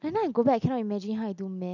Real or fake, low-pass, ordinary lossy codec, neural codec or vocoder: real; 7.2 kHz; none; none